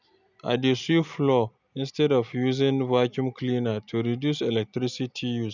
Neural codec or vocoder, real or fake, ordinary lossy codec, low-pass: none; real; none; 7.2 kHz